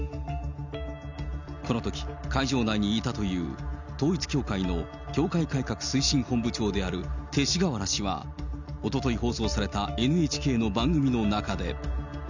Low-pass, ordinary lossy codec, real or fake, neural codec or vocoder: 7.2 kHz; none; real; none